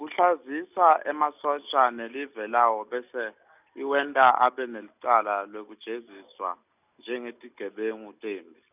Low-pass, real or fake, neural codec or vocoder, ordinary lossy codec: 3.6 kHz; real; none; none